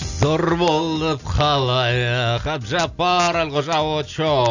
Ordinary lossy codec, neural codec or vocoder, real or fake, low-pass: none; none; real; 7.2 kHz